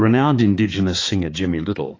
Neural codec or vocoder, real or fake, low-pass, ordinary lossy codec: codec, 16 kHz, 4 kbps, X-Codec, HuBERT features, trained on balanced general audio; fake; 7.2 kHz; AAC, 32 kbps